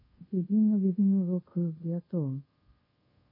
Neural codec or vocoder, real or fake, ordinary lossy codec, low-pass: codec, 24 kHz, 0.5 kbps, DualCodec; fake; MP3, 24 kbps; 5.4 kHz